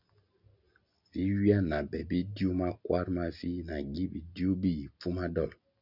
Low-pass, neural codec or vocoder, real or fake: 5.4 kHz; none; real